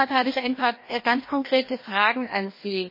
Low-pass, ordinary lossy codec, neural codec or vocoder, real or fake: 5.4 kHz; MP3, 24 kbps; codec, 16 kHz, 1 kbps, FreqCodec, larger model; fake